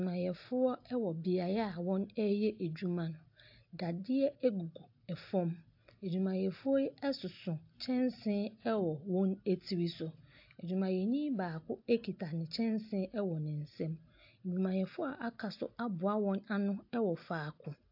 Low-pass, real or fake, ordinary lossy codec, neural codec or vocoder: 5.4 kHz; real; AAC, 48 kbps; none